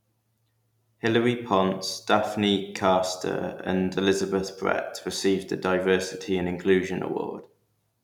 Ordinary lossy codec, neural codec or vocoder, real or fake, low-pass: none; vocoder, 44.1 kHz, 128 mel bands every 256 samples, BigVGAN v2; fake; 19.8 kHz